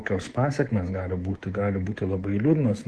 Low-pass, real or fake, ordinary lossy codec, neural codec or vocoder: 10.8 kHz; fake; Opus, 16 kbps; codec, 24 kHz, 3.1 kbps, DualCodec